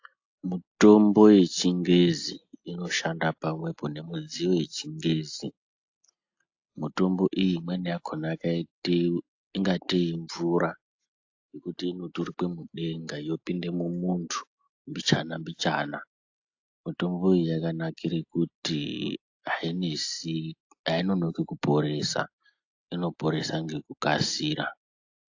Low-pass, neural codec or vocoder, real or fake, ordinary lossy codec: 7.2 kHz; none; real; AAC, 48 kbps